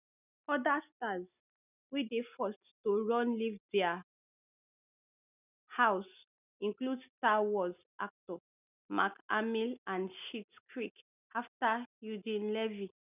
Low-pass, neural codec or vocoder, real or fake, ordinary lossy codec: 3.6 kHz; none; real; none